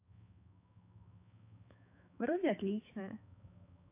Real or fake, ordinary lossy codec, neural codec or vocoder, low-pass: fake; AAC, 32 kbps; codec, 16 kHz, 4 kbps, X-Codec, HuBERT features, trained on balanced general audio; 3.6 kHz